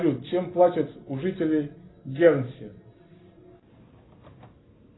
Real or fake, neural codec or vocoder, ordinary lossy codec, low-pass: real; none; AAC, 16 kbps; 7.2 kHz